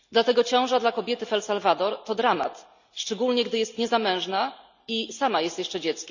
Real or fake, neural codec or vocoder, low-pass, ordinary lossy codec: real; none; 7.2 kHz; none